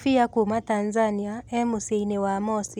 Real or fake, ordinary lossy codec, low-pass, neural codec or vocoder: real; none; 19.8 kHz; none